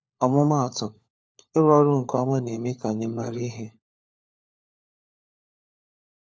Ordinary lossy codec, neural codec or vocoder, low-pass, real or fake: none; codec, 16 kHz, 4 kbps, FunCodec, trained on LibriTTS, 50 frames a second; none; fake